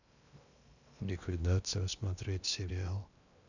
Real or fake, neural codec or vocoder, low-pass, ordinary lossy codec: fake; codec, 16 kHz in and 24 kHz out, 0.8 kbps, FocalCodec, streaming, 65536 codes; 7.2 kHz; none